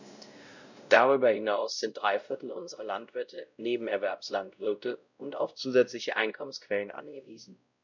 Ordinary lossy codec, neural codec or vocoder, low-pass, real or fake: none; codec, 16 kHz, 0.5 kbps, X-Codec, WavLM features, trained on Multilingual LibriSpeech; 7.2 kHz; fake